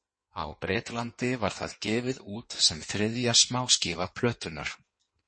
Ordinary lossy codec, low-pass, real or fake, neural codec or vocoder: MP3, 32 kbps; 9.9 kHz; fake; codec, 16 kHz in and 24 kHz out, 1.1 kbps, FireRedTTS-2 codec